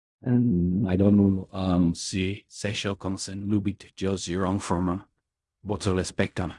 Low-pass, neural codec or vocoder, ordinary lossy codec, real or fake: 10.8 kHz; codec, 16 kHz in and 24 kHz out, 0.4 kbps, LongCat-Audio-Codec, fine tuned four codebook decoder; Opus, 64 kbps; fake